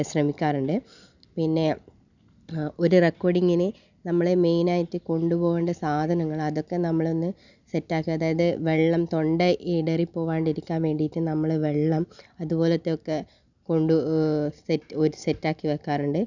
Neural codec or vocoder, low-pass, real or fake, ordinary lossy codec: none; 7.2 kHz; real; none